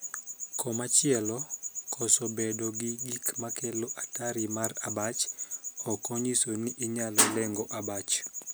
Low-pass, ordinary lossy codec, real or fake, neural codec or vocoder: none; none; real; none